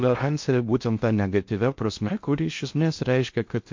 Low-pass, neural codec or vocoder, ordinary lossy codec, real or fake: 7.2 kHz; codec, 16 kHz in and 24 kHz out, 0.6 kbps, FocalCodec, streaming, 4096 codes; MP3, 48 kbps; fake